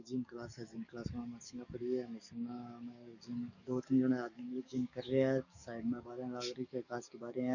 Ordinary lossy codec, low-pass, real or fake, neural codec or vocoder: none; 7.2 kHz; fake; codec, 44.1 kHz, 7.8 kbps, DAC